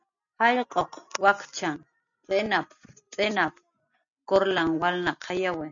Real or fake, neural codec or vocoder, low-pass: real; none; 7.2 kHz